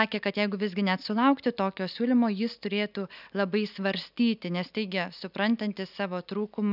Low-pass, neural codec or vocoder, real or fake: 5.4 kHz; none; real